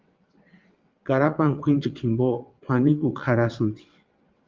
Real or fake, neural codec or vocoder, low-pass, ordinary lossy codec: fake; vocoder, 22.05 kHz, 80 mel bands, WaveNeXt; 7.2 kHz; Opus, 32 kbps